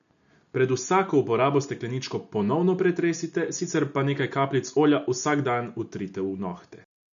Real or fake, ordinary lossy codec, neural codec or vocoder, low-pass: real; none; none; 7.2 kHz